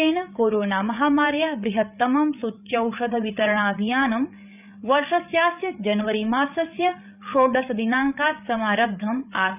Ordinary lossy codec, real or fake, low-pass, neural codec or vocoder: none; fake; 3.6 kHz; codec, 16 kHz, 8 kbps, FreqCodec, larger model